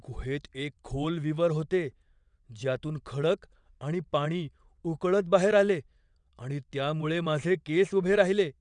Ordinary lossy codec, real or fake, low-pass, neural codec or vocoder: none; fake; 9.9 kHz; vocoder, 22.05 kHz, 80 mel bands, WaveNeXt